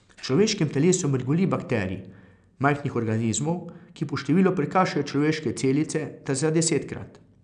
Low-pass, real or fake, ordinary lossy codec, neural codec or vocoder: 9.9 kHz; real; none; none